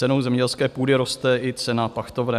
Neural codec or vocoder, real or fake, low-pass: none; real; 14.4 kHz